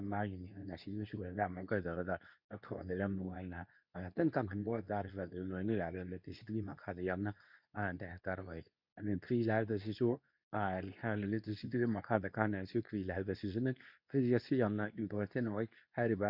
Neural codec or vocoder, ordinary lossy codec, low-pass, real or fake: codec, 24 kHz, 0.9 kbps, WavTokenizer, medium speech release version 2; none; 5.4 kHz; fake